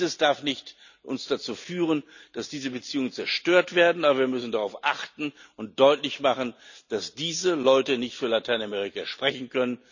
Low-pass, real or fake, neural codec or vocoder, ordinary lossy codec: 7.2 kHz; real; none; none